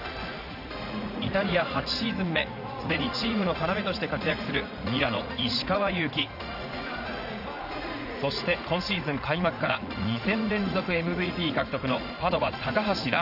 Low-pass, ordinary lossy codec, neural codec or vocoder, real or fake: 5.4 kHz; none; vocoder, 44.1 kHz, 80 mel bands, Vocos; fake